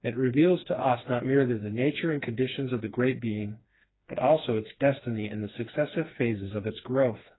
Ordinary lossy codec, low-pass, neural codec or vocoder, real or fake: AAC, 16 kbps; 7.2 kHz; codec, 16 kHz, 2 kbps, FreqCodec, smaller model; fake